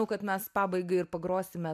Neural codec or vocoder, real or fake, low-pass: none; real; 14.4 kHz